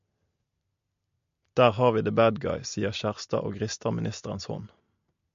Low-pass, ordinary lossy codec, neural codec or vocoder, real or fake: 7.2 kHz; MP3, 48 kbps; none; real